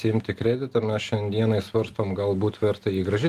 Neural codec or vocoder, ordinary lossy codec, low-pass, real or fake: none; Opus, 24 kbps; 14.4 kHz; real